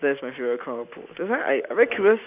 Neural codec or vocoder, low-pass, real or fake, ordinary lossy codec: none; 3.6 kHz; real; none